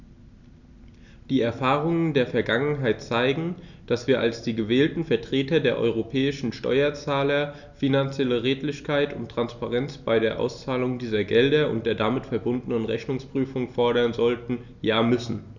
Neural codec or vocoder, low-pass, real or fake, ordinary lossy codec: none; 7.2 kHz; real; none